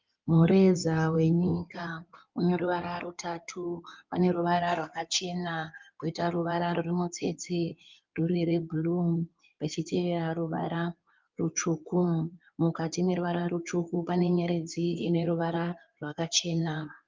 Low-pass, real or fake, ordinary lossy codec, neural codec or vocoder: 7.2 kHz; fake; Opus, 24 kbps; codec, 16 kHz in and 24 kHz out, 2.2 kbps, FireRedTTS-2 codec